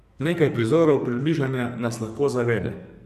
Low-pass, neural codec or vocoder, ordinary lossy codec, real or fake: 14.4 kHz; codec, 44.1 kHz, 2.6 kbps, SNAC; Opus, 64 kbps; fake